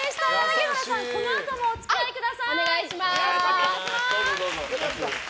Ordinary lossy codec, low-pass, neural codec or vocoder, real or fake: none; none; none; real